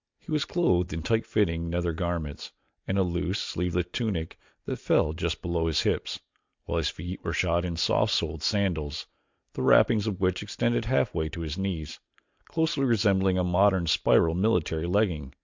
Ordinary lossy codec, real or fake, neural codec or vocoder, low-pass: MP3, 64 kbps; real; none; 7.2 kHz